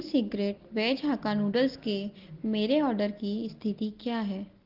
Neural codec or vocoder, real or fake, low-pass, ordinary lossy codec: none; real; 5.4 kHz; Opus, 16 kbps